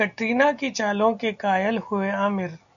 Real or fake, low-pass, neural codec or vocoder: real; 7.2 kHz; none